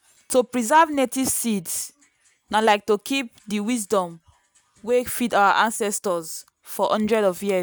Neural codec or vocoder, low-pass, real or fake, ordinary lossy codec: none; none; real; none